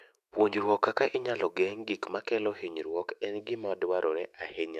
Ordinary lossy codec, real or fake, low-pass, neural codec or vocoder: none; fake; 14.4 kHz; autoencoder, 48 kHz, 128 numbers a frame, DAC-VAE, trained on Japanese speech